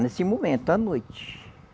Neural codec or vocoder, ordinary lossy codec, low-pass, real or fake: none; none; none; real